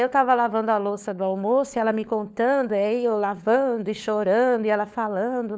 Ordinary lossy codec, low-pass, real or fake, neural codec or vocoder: none; none; fake; codec, 16 kHz, 4 kbps, FunCodec, trained on LibriTTS, 50 frames a second